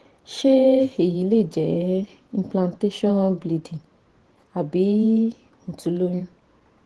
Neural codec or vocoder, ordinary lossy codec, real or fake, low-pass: vocoder, 48 kHz, 128 mel bands, Vocos; Opus, 16 kbps; fake; 10.8 kHz